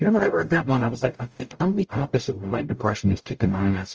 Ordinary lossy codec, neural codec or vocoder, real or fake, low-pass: Opus, 24 kbps; codec, 44.1 kHz, 0.9 kbps, DAC; fake; 7.2 kHz